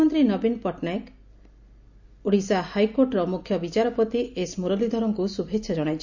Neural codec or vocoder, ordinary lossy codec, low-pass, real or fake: none; none; 7.2 kHz; real